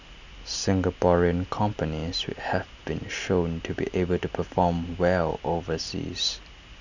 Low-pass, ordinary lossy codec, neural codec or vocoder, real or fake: 7.2 kHz; none; none; real